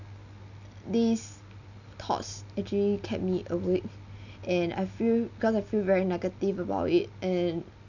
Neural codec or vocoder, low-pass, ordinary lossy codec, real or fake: none; 7.2 kHz; none; real